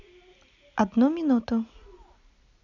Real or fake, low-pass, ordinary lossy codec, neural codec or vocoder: real; 7.2 kHz; none; none